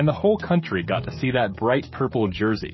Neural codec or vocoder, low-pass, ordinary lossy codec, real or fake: codec, 16 kHz, 16 kbps, FreqCodec, smaller model; 7.2 kHz; MP3, 24 kbps; fake